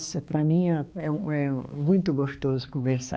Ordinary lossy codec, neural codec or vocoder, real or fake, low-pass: none; codec, 16 kHz, 2 kbps, X-Codec, HuBERT features, trained on balanced general audio; fake; none